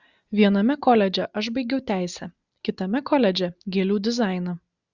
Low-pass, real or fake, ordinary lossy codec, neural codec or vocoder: 7.2 kHz; real; Opus, 64 kbps; none